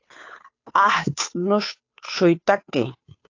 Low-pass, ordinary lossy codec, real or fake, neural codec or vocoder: 7.2 kHz; AAC, 48 kbps; fake; codec, 16 kHz, 2 kbps, FunCodec, trained on Chinese and English, 25 frames a second